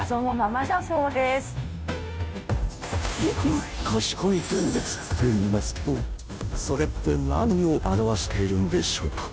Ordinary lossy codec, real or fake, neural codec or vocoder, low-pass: none; fake; codec, 16 kHz, 0.5 kbps, FunCodec, trained on Chinese and English, 25 frames a second; none